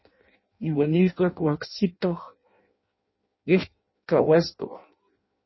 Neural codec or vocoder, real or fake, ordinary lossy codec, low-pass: codec, 16 kHz in and 24 kHz out, 0.6 kbps, FireRedTTS-2 codec; fake; MP3, 24 kbps; 7.2 kHz